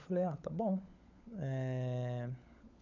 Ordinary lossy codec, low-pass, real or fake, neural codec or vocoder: none; 7.2 kHz; fake; codec, 16 kHz, 16 kbps, FunCodec, trained on LibriTTS, 50 frames a second